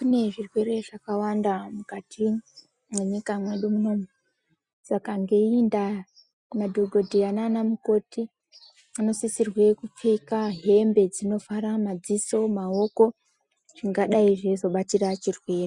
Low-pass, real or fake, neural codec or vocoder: 10.8 kHz; real; none